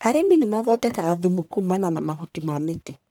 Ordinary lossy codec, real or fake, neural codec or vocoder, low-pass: none; fake; codec, 44.1 kHz, 1.7 kbps, Pupu-Codec; none